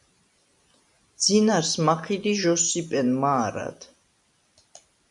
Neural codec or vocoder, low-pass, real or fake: none; 10.8 kHz; real